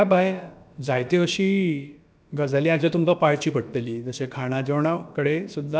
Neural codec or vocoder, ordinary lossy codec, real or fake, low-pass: codec, 16 kHz, about 1 kbps, DyCAST, with the encoder's durations; none; fake; none